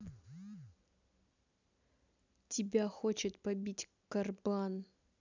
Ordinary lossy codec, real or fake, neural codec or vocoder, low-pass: none; real; none; 7.2 kHz